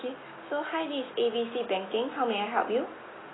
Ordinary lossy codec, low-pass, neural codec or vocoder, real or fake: AAC, 16 kbps; 7.2 kHz; none; real